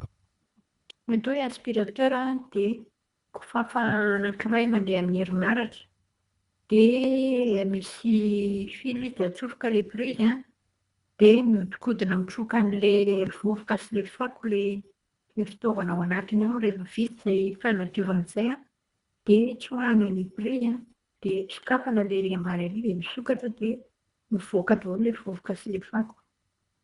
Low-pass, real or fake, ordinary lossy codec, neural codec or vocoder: 10.8 kHz; fake; Opus, 64 kbps; codec, 24 kHz, 1.5 kbps, HILCodec